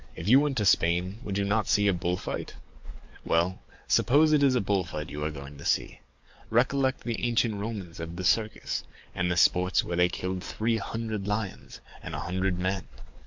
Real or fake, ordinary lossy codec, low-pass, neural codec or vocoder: fake; MP3, 64 kbps; 7.2 kHz; codec, 44.1 kHz, 7.8 kbps, DAC